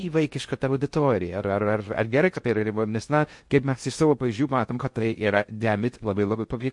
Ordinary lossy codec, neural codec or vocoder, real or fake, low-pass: MP3, 48 kbps; codec, 16 kHz in and 24 kHz out, 0.6 kbps, FocalCodec, streaming, 2048 codes; fake; 10.8 kHz